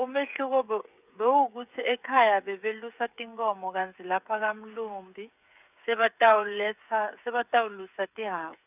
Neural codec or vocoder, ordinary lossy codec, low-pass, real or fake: codec, 16 kHz, 8 kbps, FreqCodec, smaller model; none; 3.6 kHz; fake